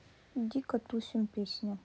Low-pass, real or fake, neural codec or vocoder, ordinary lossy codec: none; real; none; none